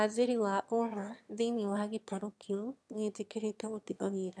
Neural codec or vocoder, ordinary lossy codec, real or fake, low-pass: autoencoder, 22.05 kHz, a latent of 192 numbers a frame, VITS, trained on one speaker; none; fake; none